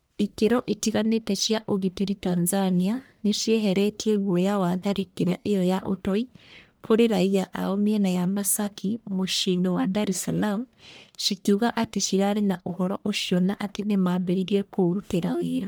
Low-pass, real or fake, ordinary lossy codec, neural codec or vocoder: none; fake; none; codec, 44.1 kHz, 1.7 kbps, Pupu-Codec